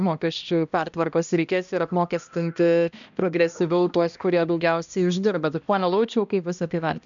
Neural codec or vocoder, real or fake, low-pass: codec, 16 kHz, 1 kbps, X-Codec, HuBERT features, trained on balanced general audio; fake; 7.2 kHz